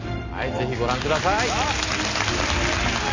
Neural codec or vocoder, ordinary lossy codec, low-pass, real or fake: none; AAC, 48 kbps; 7.2 kHz; real